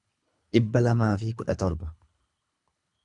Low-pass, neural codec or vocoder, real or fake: 10.8 kHz; codec, 24 kHz, 3 kbps, HILCodec; fake